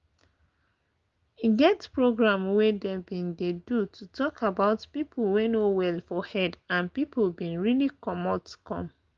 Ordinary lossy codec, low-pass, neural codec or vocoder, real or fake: Opus, 32 kbps; 7.2 kHz; none; real